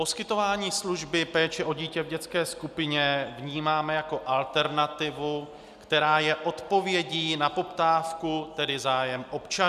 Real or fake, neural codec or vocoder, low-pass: real; none; 14.4 kHz